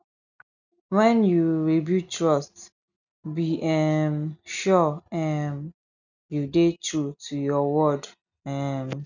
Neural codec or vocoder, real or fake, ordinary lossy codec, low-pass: none; real; none; 7.2 kHz